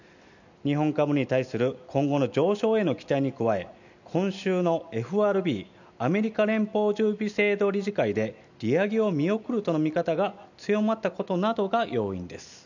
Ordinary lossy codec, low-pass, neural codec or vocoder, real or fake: none; 7.2 kHz; none; real